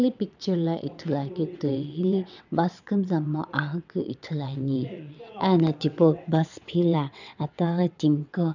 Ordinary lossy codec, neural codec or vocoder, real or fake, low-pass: none; vocoder, 22.05 kHz, 80 mel bands, WaveNeXt; fake; 7.2 kHz